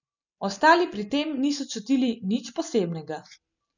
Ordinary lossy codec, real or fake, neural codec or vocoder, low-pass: none; real; none; 7.2 kHz